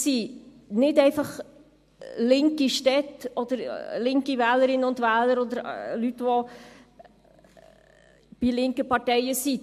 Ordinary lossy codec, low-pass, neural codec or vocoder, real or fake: MP3, 64 kbps; 14.4 kHz; none; real